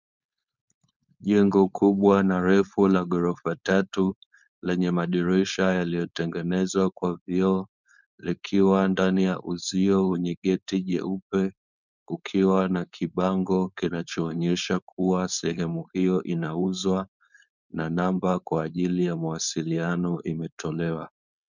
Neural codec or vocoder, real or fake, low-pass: codec, 16 kHz, 4.8 kbps, FACodec; fake; 7.2 kHz